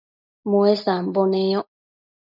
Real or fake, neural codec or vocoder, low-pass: real; none; 5.4 kHz